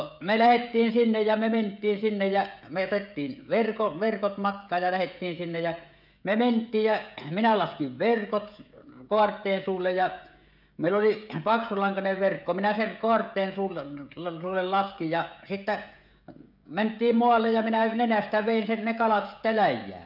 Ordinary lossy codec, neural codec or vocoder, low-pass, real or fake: none; codec, 16 kHz, 16 kbps, FreqCodec, smaller model; 5.4 kHz; fake